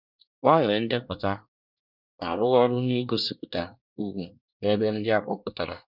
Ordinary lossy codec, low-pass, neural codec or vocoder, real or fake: none; 5.4 kHz; codec, 24 kHz, 1 kbps, SNAC; fake